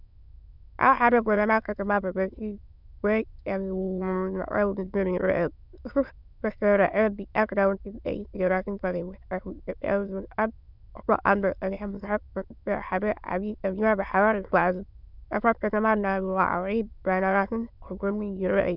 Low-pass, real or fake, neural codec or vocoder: 5.4 kHz; fake; autoencoder, 22.05 kHz, a latent of 192 numbers a frame, VITS, trained on many speakers